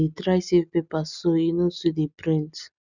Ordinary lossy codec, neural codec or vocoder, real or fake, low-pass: none; none; real; 7.2 kHz